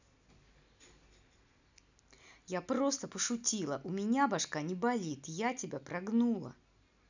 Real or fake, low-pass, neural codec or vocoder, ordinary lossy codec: real; 7.2 kHz; none; none